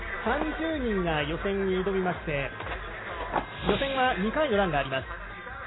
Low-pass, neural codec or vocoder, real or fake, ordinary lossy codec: 7.2 kHz; none; real; AAC, 16 kbps